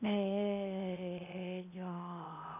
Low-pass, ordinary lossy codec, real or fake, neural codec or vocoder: 3.6 kHz; none; fake; codec, 16 kHz in and 24 kHz out, 0.6 kbps, FocalCodec, streaming, 4096 codes